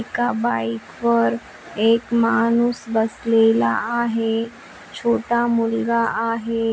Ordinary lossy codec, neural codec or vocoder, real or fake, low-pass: none; none; real; none